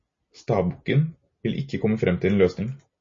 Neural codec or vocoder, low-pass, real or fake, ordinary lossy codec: none; 7.2 kHz; real; MP3, 32 kbps